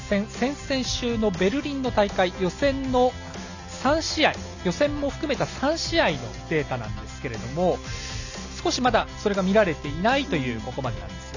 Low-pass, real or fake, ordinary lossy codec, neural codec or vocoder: 7.2 kHz; real; none; none